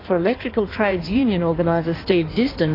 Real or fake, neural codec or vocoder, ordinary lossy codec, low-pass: fake; codec, 16 kHz in and 24 kHz out, 1.1 kbps, FireRedTTS-2 codec; AAC, 24 kbps; 5.4 kHz